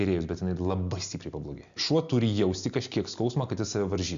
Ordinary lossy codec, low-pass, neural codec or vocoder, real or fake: Opus, 64 kbps; 7.2 kHz; none; real